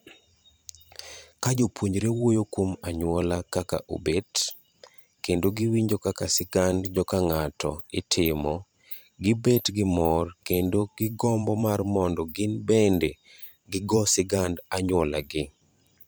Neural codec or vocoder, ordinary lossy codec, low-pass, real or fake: vocoder, 44.1 kHz, 128 mel bands every 512 samples, BigVGAN v2; none; none; fake